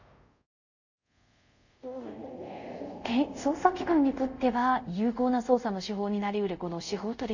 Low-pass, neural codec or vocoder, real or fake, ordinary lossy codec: 7.2 kHz; codec, 24 kHz, 0.5 kbps, DualCodec; fake; none